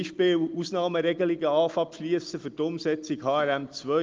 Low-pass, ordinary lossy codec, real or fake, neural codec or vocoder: 7.2 kHz; Opus, 24 kbps; real; none